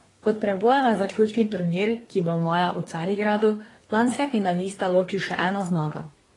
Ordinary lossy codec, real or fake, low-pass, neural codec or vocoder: AAC, 32 kbps; fake; 10.8 kHz; codec, 24 kHz, 1 kbps, SNAC